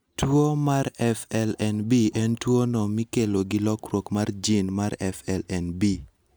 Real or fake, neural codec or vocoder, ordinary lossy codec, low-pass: real; none; none; none